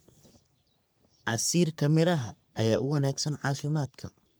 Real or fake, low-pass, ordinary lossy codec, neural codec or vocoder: fake; none; none; codec, 44.1 kHz, 3.4 kbps, Pupu-Codec